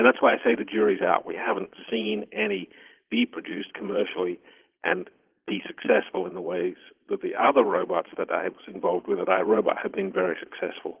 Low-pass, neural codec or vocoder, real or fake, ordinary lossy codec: 3.6 kHz; vocoder, 22.05 kHz, 80 mel bands, Vocos; fake; Opus, 16 kbps